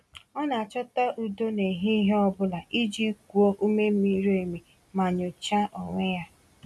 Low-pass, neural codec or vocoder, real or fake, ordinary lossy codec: none; none; real; none